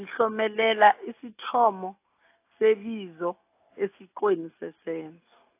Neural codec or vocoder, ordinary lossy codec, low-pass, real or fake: none; AAC, 24 kbps; 3.6 kHz; real